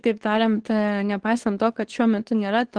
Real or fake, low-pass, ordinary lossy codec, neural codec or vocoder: fake; 9.9 kHz; Opus, 16 kbps; codec, 24 kHz, 0.9 kbps, WavTokenizer, medium speech release version 2